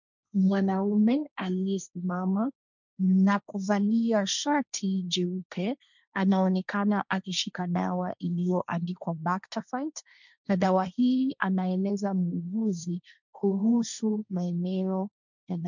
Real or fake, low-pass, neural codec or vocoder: fake; 7.2 kHz; codec, 16 kHz, 1.1 kbps, Voila-Tokenizer